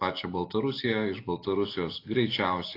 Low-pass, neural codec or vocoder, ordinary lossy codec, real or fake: 5.4 kHz; none; AAC, 32 kbps; real